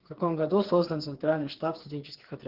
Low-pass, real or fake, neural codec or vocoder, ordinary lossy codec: 5.4 kHz; fake; codec, 16 kHz, 4 kbps, FreqCodec, smaller model; Opus, 16 kbps